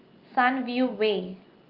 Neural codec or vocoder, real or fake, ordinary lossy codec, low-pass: none; real; Opus, 32 kbps; 5.4 kHz